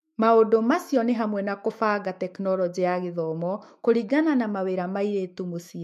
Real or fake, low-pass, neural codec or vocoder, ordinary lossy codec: fake; 14.4 kHz; autoencoder, 48 kHz, 128 numbers a frame, DAC-VAE, trained on Japanese speech; MP3, 64 kbps